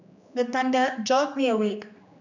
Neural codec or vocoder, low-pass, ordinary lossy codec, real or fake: codec, 16 kHz, 2 kbps, X-Codec, HuBERT features, trained on general audio; 7.2 kHz; none; fake